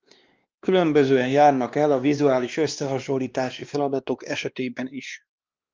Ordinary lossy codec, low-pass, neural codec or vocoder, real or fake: Opus, 32 kbps; 7.2 kHz; codec, 16 kHz, 2 kbps, X-Codec, WavLM features, trained on Multilingual LibriSpeech; fake